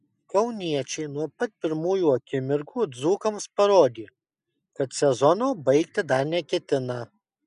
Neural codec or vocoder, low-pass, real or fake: none; 10.8 kHz; real